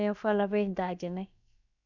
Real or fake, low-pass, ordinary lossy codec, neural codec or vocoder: fake; 7.2 kHz; none; codec, 16 kHz, about 1 kbps, DyCAST, with the encoder's durations